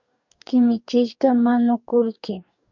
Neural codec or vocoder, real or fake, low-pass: codec, 44.1 kHz, 2.6 kbps, DAC; fake; 7.2 kHz